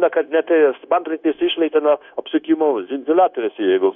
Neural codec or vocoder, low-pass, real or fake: codec, 16 kHz, 0.9 kbps, LongCat-Audio-Codec; 7.2 kHz; fake